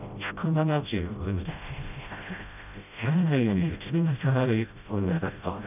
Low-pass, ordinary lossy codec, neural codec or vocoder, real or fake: 3.6 kHz; none; codec, 16 kHz, 0.5 kbps, FreqCodec, smaller model; fake